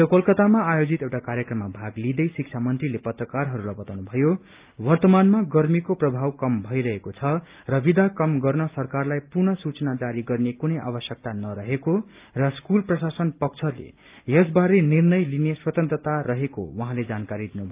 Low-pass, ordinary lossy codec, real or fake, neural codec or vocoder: 3.6 kHz; Opus, 24 kbps; real; none